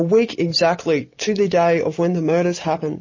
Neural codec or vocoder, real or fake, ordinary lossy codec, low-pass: none; real; MP3, 32 kbps; 7.2 kHz